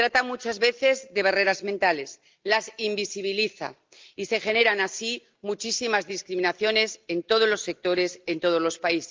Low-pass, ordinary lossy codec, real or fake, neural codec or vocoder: 7.2 kHz; Opus, 32 kbps; real; none